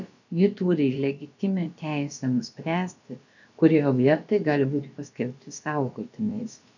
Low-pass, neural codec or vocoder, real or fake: 7.2 kHz; codec, 16 kHz, about 1 kbps, DyCAST, with the encoder's durations; fake